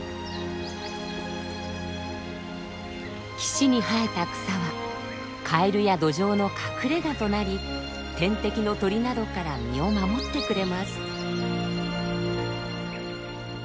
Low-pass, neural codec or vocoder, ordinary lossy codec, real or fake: none; none; none; real